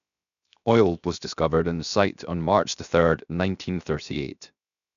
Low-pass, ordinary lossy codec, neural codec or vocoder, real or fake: 7.2 kHz; none; codec, 16 kHz, 0.7 kbps, FocalCodec; fake